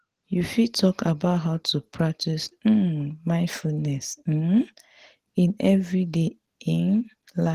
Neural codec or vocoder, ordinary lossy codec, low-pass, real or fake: none; Opus, 16 kbps; 14.4 kHz; real